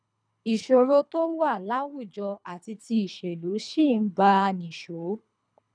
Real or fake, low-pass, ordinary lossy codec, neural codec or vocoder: fake; 9.9 kHz; none; codec, 24 kHz, 3 kbps, HILCodec